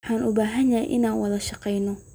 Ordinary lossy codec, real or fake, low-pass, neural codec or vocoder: none; real; none; none